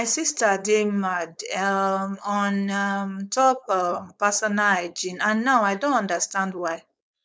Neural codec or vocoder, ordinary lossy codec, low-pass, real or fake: codec, 16 kHz, 4.8 kbps, FACodec; none; none; fake